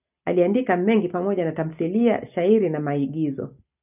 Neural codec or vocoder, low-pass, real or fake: none; 3.6 kHz; real